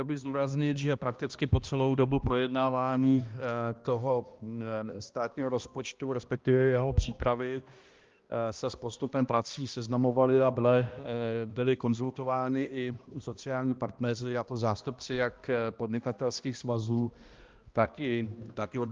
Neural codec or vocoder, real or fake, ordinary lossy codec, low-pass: codec, 16 kHz, 1 kbps, X-Codec, HuBERT features, trained on balanced general audio; fake; Opus, 32 kbps; 7.2 kHz